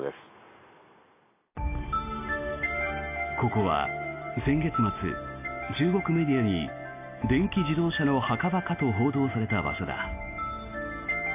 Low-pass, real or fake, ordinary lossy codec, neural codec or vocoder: 3.6 kHz; real; AAC, 24 kbps; none